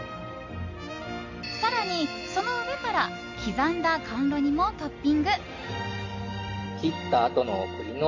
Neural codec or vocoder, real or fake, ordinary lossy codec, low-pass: none; real; MP3, 48 kbps; 7.2 kHz